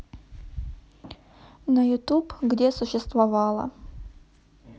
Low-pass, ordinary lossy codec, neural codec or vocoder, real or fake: none; none; none; real